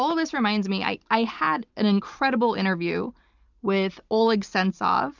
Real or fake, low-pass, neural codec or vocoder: real; 7.2 kHz; none